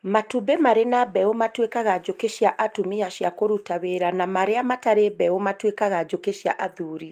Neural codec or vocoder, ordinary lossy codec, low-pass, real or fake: none; Opus, 32 kbps; 14.4 kHz; real